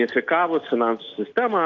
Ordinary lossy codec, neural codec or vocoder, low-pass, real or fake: Opus, 32 kbps; none; 7.2 kHz; real